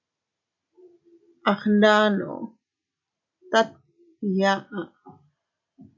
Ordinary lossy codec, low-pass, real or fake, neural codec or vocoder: AAC, 48 kbps; 7.2 kHz; real; none